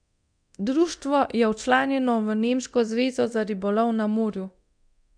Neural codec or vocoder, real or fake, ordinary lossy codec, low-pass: codec, 24 kHz, 0.9 kbps, DualCodec; fake; none; 9.9 kHz